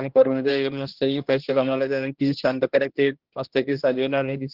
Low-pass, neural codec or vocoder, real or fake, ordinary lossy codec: 5.4 kHz; codec, 16 kHz, 1 kbps, X-Codec, HuBERT features, trained on general audio; fake; Opus, 16 kbps